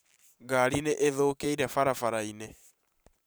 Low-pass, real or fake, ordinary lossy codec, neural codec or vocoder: none; fake; none; vocoder, 44.1 kHz, 128 mel bands every 512 samples, BigVGAN v2